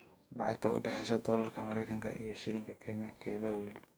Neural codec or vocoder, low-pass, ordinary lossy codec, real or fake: codec, 44.1 kHz, 2.6 kbps, DAC; none; none; fake